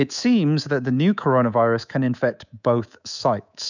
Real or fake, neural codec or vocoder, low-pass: fake; codec, 24 kHz, 3.1 kbps, DualCodec; 7.2 kHz